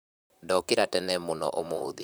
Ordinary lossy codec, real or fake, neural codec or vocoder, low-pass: none; fake; vocoder, 44.1 kHz, 128 mel bands, Pupu-Vocoder; none